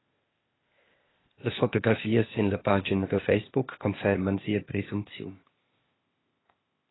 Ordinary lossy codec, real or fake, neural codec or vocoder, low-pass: AAC, 16 kbps; fake; codec, 16 kHz, 0.8 kbps, ZipCodec; 7.2 kHz